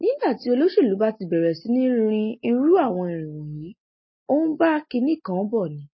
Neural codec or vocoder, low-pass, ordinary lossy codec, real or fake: none; 7.2 kHz; MP3, 24 kbps; real